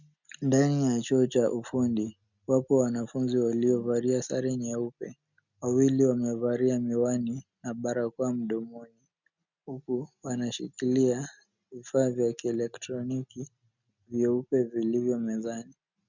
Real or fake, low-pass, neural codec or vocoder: real; 7.2 kHz; none